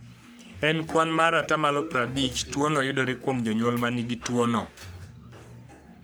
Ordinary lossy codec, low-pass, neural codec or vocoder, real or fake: none; none; codec, 44.1 kHz, 3.4 kbps, Pupu-Codec; fake